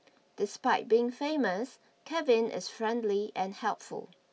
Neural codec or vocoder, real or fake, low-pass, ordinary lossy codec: none; real; none; none